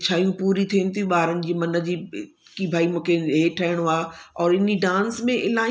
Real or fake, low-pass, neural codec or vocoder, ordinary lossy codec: real; none; none; none